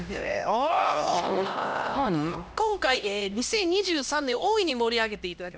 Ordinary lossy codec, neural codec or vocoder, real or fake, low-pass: none; codec, 16 kHz, 1 kbps, X-Codec, HuBERT features, trained on LibriSpeech; fake; none